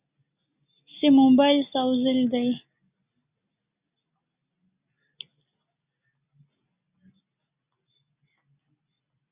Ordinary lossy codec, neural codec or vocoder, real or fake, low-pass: Opus, 64 kbps; none; real; 3.6 kHz